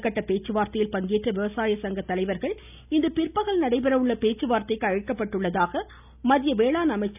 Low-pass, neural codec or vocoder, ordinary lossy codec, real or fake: 3.6 kHz; none; none; real